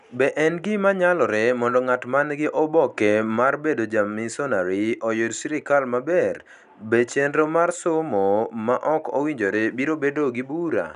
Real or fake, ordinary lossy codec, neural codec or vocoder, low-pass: real; none; none; 10.8 kHz